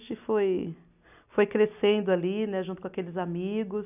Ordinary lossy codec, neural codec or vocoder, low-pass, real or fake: none; none; 3.6 kHz; real